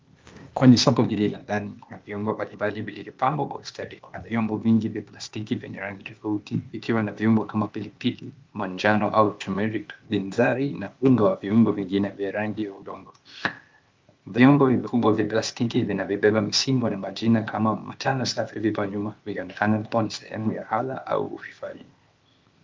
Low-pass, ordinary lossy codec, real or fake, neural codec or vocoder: 7.2 kHz; Opus, 32 kbps; fake; codec, 16 kHz, 0.8 kbps, ZipCodec